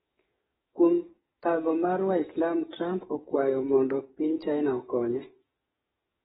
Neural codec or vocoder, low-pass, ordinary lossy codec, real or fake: codec, 44.1 kHz, 7.8 kbps, DAC; 19.8 kHz; AAC, 16 kbps; fake